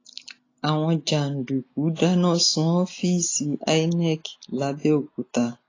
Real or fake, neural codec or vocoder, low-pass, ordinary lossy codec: real; none; 7.2 kHz; AAC, 32 kbps